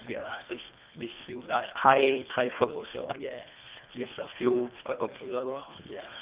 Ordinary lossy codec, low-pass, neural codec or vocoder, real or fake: Opus, 32 kbps; 3.6 kHz; codec, 24 kHz, 1.5 kbps, HILCodec; fake